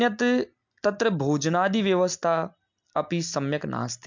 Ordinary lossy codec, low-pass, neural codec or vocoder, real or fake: MP3, 64 kbps; 7.2 kHz; none; real